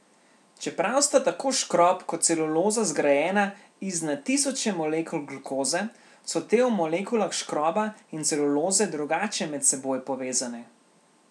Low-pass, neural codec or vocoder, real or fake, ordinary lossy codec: none; none; real; none